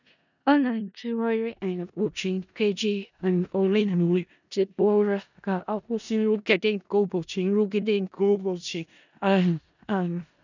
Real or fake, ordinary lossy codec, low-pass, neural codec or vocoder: fake; none; 7.2 kHz; codec, 16 kHz in and 24 kHz out, 0.4 kbps, LongCat-Audio-Codec, four codebook decoder